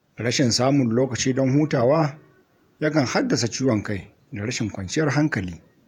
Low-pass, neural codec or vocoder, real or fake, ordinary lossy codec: 19.8 kHz; vocoder, 48 kHz, 128 mel bands, Vocos; fake; none